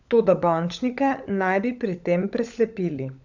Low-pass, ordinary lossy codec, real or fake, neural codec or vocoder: 7.2 kHz; none; fake; codec, 16 kHz, 16 kbps, FunCodec, trained on LibriTTS, 50 frames a second